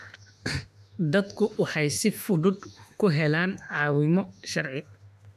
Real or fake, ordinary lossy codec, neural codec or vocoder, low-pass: fake; none; autoencoder, 48 kHz, 32 numbers a frame, DAC-VAE, trained on Japanese speech; 14.4 kHz